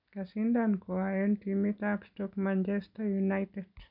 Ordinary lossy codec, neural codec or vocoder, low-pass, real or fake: none; none; 5.4 kHz; real